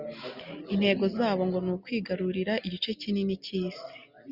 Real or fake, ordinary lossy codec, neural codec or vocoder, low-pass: real; Opus, 64 kbps; none; 5.4 kHz